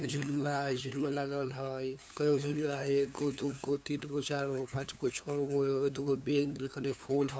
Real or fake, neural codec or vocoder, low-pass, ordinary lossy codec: fake; codec, 16 kHz, 2 kbps, FunCodec, trained on LibriTTS, 25 frames a second; none; none